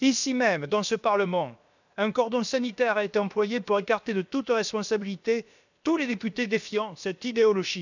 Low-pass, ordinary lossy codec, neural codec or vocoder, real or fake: 7.2 kHz; none; codec, 16 kHz, about 1 kbps, DyCAST, with the encoder's durations; fake